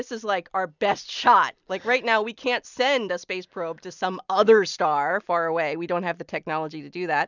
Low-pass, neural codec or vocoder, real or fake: 7.2 kHz; vocoder, 44.1 kHz, 128 mel bands every 256 samples, BigVGAN v2; fake